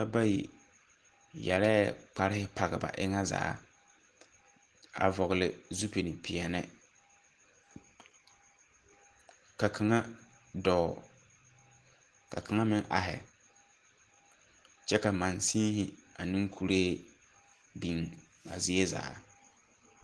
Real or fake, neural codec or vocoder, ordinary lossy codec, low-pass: real; none; Opus, 16 kbps; 10.8 kHz